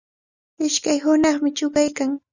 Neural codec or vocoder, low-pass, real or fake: none; 7.2 kHz; real